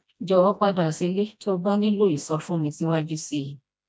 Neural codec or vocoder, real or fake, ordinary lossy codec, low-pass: codec, 16 kHz, 1 kbps, FreqCodec, smaller model; fake; none; none